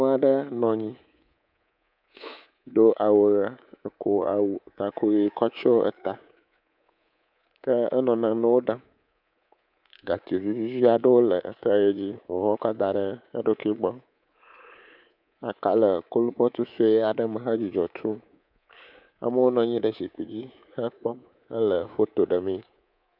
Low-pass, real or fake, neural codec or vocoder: 5.4 kHz; fake; codec, 24 kHz, 3.1 kbps, DualCodec